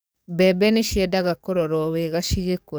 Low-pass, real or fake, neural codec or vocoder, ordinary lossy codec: none; fake; codec, 44.1 kHz, 7.8 kbps, DAC; none